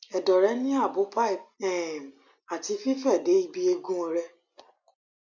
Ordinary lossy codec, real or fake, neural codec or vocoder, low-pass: none; real; none; 7.2 kHz